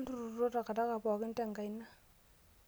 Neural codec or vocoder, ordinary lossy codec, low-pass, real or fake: none; none; none; real